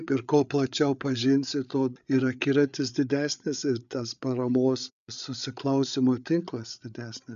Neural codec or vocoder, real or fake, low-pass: codec, 16 kHz, 8 kbps, FreqCodec, larger model; fake; 7.2 kHz